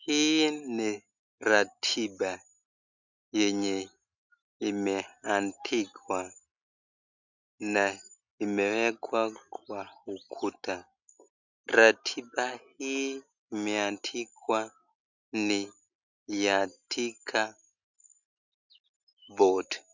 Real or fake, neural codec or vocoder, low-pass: real; none; 7.2 kHz